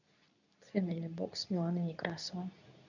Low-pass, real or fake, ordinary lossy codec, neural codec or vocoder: 7.2 kHz; fake; none; codec, 24 kHz, 0.9 kbps, WavTokenizer, medium speech release version 2